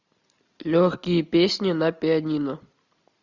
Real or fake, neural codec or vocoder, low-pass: real; none; 7.2 kHz